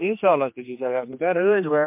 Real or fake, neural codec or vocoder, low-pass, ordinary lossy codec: fake; codec, 16 kHz, 2 kbps, X-Codec, HuBERT features, trained on general audio; 3.6 kHz; MP3, 32 kbps